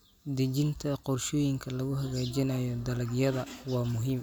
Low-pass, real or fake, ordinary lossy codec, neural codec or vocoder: none; real; none; none